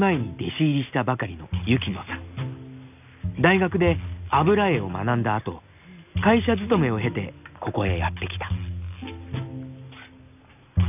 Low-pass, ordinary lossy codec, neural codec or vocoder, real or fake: 3.6 kHz; none; none; real